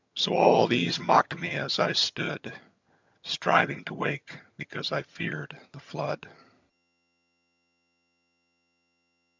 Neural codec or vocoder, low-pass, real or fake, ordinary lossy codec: vocoder, 22.05 kHz, 80 mel bands, HiFi-GAN; 7.2 kHz; fake; MP3, 64 kbps